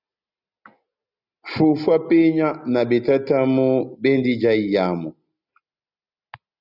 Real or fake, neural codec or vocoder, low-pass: real; none; 5.4 kHz